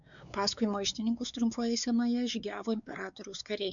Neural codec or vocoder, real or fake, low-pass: codec, 16 kHz, 4 kbps, X-Codec, WavLM features, trained on Multilingual LibriSpeech; fake; 7.2 kHz